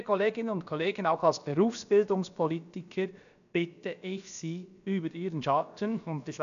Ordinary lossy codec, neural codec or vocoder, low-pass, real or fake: none; codec, 16 kHz, about 1 kbps, DyCAST, with the encoder's durations; 7.2 kHz; fake